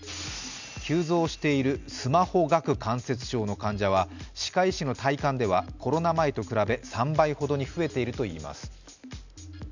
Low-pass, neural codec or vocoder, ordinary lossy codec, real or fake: 7.2 kHz; none; none; real